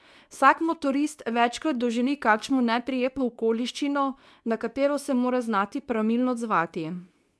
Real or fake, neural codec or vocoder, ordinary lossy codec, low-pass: fake; codec, 24 kHz, 0.9 kbps, WavTokenizer, medium speech release version 2; none; none